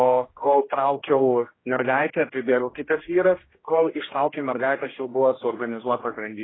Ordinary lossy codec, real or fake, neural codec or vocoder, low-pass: AAC, 16 kbps; fake; codec, 16 kHz, 1 kbps, X-Codec, HuBERT features, trained on general audio; 7.2 kHz